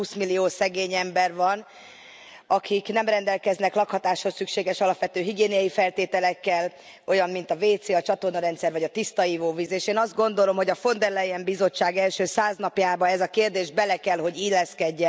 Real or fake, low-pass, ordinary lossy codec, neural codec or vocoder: real; none; none; none